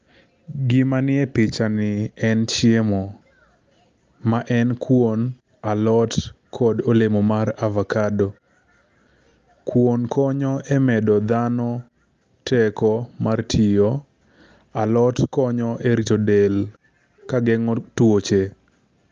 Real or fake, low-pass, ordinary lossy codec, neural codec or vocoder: real; 7.2 kHz; Opus, 32 kbps; none